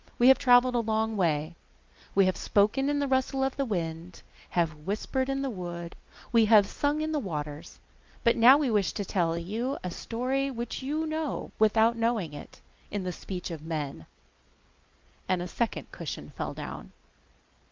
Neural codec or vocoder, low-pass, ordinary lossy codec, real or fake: none; 7.2 kHz; Opus, 24 kbps; real